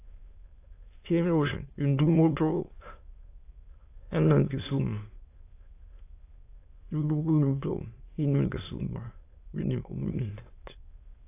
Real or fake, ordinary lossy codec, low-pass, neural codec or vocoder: fake; AAC, 24 kbps; 3.6 kHz; autoencoder, 22.05 kHz, a latent of 192 numbers a frame, VITS, trained on many speakers